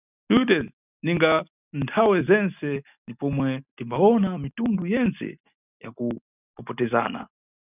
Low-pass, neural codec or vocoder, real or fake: 3.6 kHz; none; real